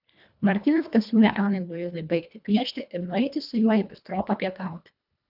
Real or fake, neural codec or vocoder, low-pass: fake; codec, 24 kHz, 1.5 kbps, HILCodec; 5.4 kHz